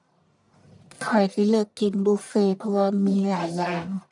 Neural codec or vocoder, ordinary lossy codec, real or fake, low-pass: codec, 44.1 kHz, 1.7 kbps, Pupu-Codec; none; fake; 10.8 kHz